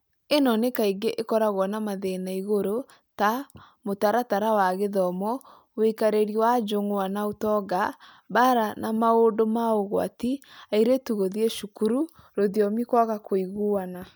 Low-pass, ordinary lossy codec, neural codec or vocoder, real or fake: none; none; none; real